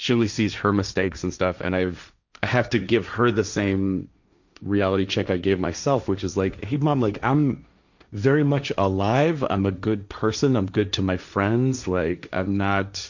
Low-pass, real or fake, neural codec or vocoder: 7.2 kHz; fake; codec, 16 kHz, 1.1 kbps, Voila-Tokenizer